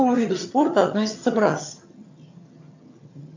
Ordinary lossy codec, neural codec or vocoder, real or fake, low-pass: AAC, 48 kbps; vocoder, 22.05 kHz, 80 mel bands, HiFi-GAN; fake; 7.2 kHz